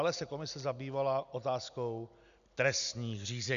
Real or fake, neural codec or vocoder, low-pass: real; none; 7.2 kHz